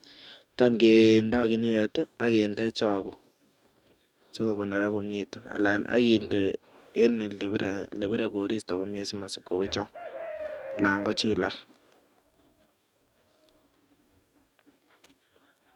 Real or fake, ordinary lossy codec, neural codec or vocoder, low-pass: fake; none; codec, 44.1 kHz, 2.6 kbps, DAC; 19.8 kHz